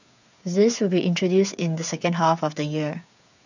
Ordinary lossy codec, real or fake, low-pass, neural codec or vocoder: none; fake; 7.2 kHz; codec, 16 kHz, 8 kbps, FreqCodec, smaller model